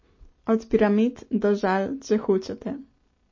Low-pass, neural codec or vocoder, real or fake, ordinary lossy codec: 7.2 kHz; none; real; MP3, 32 kbps